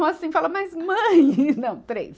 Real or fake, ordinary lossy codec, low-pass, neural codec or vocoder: real; none; none; none